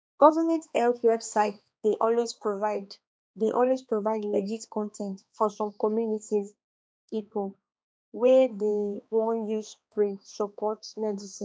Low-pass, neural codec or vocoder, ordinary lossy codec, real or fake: none; codec, 16 kHz, 2 kbps, X-Codec, HuBERT features, trained on balanced general audio; none; fake